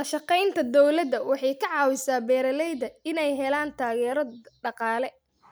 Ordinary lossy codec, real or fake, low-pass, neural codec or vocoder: none; real; none; none